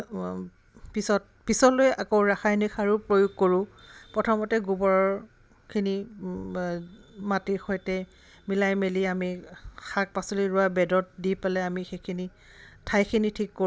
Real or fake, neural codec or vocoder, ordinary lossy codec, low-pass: real; none; none; none